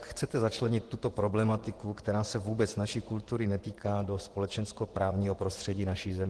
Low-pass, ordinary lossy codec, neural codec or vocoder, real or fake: 10.8 kHz; Opus, 16 kbps; vocoder, 24 kHz, 100 mel bands, Vocos; fake